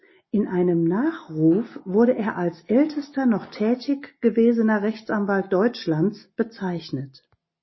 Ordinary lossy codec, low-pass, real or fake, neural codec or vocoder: MP3, 24 kbps; 7.2 kHz; real; none